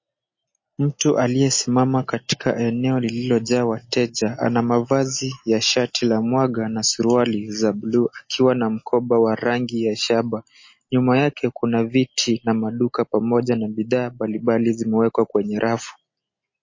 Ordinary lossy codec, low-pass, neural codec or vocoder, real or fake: MP3, 32 kbps; 7.2 kHz; none; real